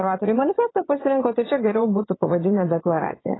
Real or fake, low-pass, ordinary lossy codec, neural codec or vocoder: fake; 7.2 kHz; AAC, 16 kbps; vocoder, 22.05 kHz, 80 mel bands, WaveNeXt